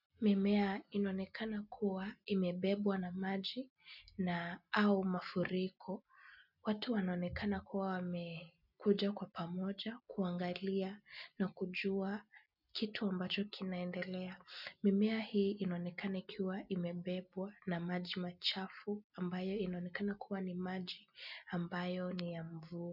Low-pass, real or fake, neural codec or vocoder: 5.4 kHz; real; none